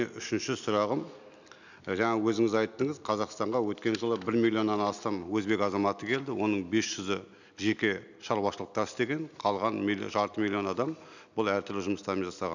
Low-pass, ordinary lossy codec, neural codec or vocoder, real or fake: 7.2 kHz; none; none; real